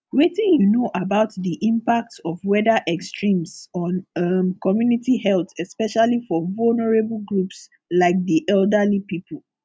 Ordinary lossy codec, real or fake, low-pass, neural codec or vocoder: none; real; none; none